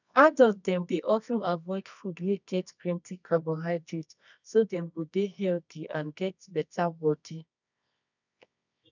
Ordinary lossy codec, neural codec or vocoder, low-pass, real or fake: none; codec, 24 kHz, 0.9 kbps, WavTokenizer, medium music audio release; 7.2 kHz; fake